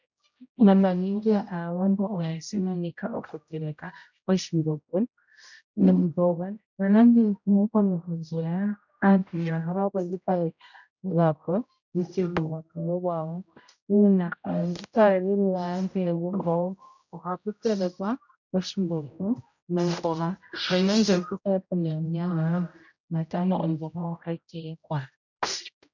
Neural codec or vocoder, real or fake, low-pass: codec, 16 kHz, 0.5 kbps, X-Codec, HuBERT features, trained on general audio; fake; 7.2 kHz